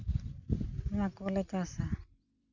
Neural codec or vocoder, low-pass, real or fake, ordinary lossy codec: none; 7.2 kHz; real; none